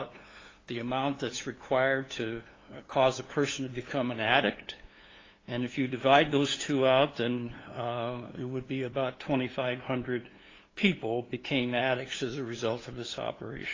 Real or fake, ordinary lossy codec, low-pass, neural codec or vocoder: fake; AAC, 32 kbps; 7.2 kHz; codec, 16 kHz, 2 kbps, FunCodec, trained on LibriTTS, 25 frames a second